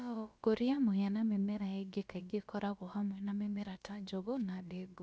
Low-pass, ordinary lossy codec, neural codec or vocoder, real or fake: none; none; codec, 16 kHz, about 1 kbps, DyCAST, with the encoder's durations; fake